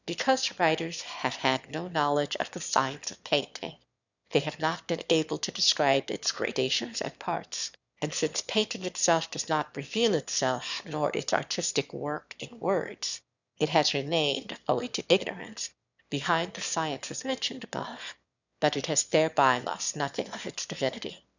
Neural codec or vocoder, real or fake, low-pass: autoencoder, 22.05 kHz, a latent of 192 numbers a frame, VITS, trained on one speaker; fake; 7.2 kHz